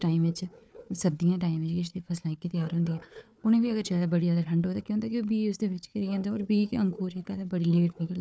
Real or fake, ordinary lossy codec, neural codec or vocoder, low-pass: fake; none; codec, 16 kHz, 4 kbps, FunCodec, trained on Chinese and English, 50 frames a second; none